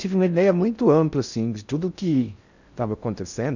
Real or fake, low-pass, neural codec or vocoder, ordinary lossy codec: fake; 7.2 kHz; codec, 16 kHz in and 24 kHz out, 0.6 kbps, FocalCodec, streaming, 4096 codes; none